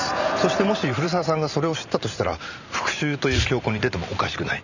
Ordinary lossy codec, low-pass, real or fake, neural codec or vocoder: none; 7.2 kHz; fake; vocoder, 44.1 kHz, 128 mel bands every 512 samples, BigVGAN v2